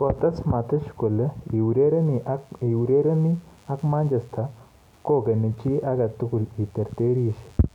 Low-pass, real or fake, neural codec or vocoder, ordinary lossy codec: 19.8 kHz; real; none; none